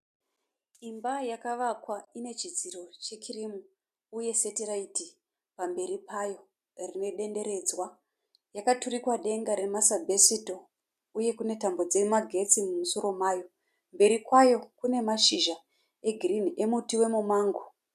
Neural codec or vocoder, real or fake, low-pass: none; real; 14.4 kHz